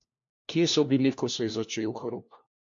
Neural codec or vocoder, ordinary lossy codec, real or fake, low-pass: codec, 16 kHz, 1 kbps, FunCodec, trained on LibriTTS, 50 frames a second; MP3, 48 kbps; fake; 7.2 kHz